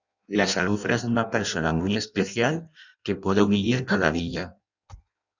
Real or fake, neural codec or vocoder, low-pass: fake; codec, 16 kHz in and 24 kHz out, 0.6 kbps, FireRedTTS-2 codec; 7.2 kHz